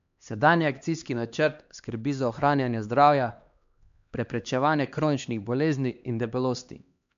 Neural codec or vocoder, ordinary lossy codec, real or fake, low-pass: codec, 16 kHz, 2 kbps, X-Codec, HuBERT features, trained on LibriSpeech; MP3, 64 kbps; fake; 7.2 kHz